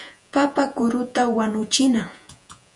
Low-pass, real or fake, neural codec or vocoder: 10.8 kHz; fake; vocoder, 48 kHz, 128 mel bands, Vocos